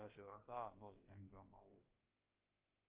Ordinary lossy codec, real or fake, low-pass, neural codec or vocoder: Opus, 32 kbps; fake; 3.6 kHz; codec, 16 kHz, about 1 kbps, DyCAST, with the encoder's durations